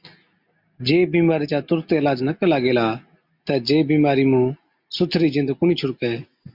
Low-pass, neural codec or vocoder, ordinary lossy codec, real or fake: 5.4 kHz; none; MP3, 48 kbps; real